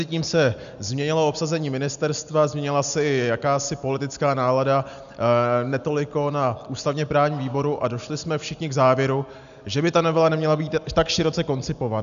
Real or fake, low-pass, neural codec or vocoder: real; 7.2 kHz; none